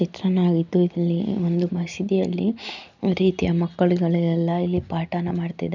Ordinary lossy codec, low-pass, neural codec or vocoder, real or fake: none; 7.2 kHz; none; real